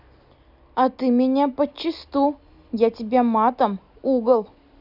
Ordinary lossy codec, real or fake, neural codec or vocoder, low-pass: none; real; none; 5.4 kHz